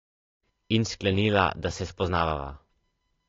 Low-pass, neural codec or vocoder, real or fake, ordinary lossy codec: 7.2 kHz; none; real; AAC, 32 kbps